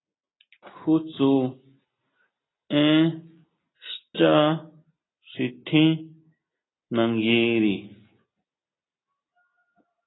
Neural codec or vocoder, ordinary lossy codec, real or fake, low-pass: none; AAC, 16 kbps; real; 7.2 kHz